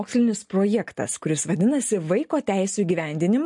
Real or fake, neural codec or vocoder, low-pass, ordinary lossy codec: real; none; 10.8 kHz; MP3, 48 kbps